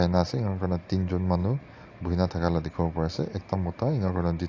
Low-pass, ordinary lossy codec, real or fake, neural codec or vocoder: 7.2 kHz; AAC, 48 kbps; real; none